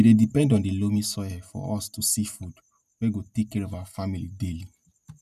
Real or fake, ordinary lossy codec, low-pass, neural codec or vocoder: real; none; 14.4 kHz; none